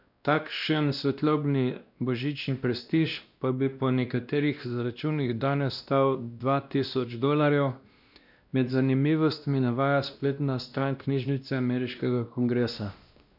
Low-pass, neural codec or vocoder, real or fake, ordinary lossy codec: 5.4 kHz; codec, 16 kHz, 1 kbps, X-Codec, WavLM features, trained on Multilingual LibriSpeech; fake; none